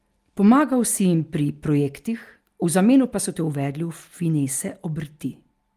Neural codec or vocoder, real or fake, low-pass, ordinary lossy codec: none; real; 14.4 kHz; Opus, 32 kbps